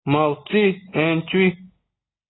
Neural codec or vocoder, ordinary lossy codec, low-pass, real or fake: none; AAC, 16 kbps; 7.2 kHz; real